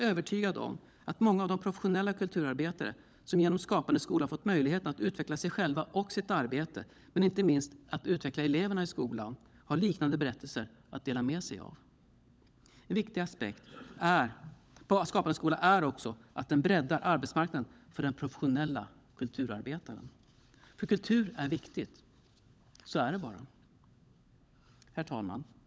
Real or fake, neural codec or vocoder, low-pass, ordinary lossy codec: fake; codec, 16 kHz, 16 kbps, FunCodec, trained on LibriTTS, 50 frames a second; none; none